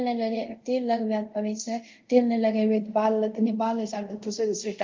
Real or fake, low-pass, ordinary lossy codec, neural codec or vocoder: fake; 7.2 kHz; Opus, 32 kbps; codec, 24 kHz, 0.5 kbps, DualCodec